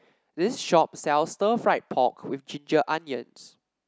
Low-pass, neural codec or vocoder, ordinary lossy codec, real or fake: none; none; none; real